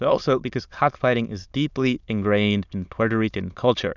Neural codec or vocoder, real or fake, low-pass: autoencoder, 22.05 kHz, a latent of 192 numbers a frame, VITS, trained on many speakers; fake; 7.2 kHz